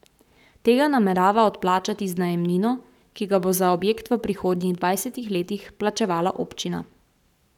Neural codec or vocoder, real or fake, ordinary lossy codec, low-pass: codec, 44.1 kHz, 7.8 kbps, Pupu-Codec; fake; none; 19.8 kHz